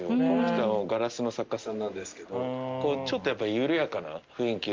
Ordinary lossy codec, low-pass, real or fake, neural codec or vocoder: Opus, 24 kbps; 7.2 kHz; real; none